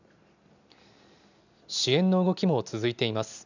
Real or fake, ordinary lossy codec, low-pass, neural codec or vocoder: real; none; 7.2 kHz; none